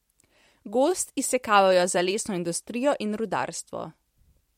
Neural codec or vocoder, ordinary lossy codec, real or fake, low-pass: vocoder, 44.1 kHz, 128 mel bands every 256 samples, BigVGAN v2; MP3, 64 kbps; fake; 19.8 kHz